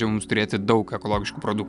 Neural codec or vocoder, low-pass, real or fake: none; 10.8 kHz; real